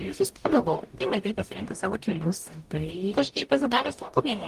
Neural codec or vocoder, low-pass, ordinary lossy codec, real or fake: codec, 44.1 kHz, 0.9 kbps, DAC; 14.4 kHz; Opus, 24 kbps; fake